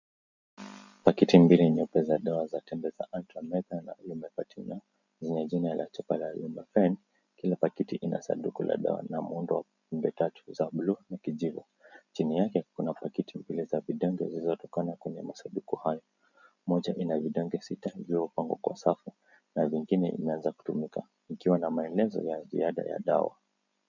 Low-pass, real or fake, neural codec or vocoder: 7.2 kHz; real; none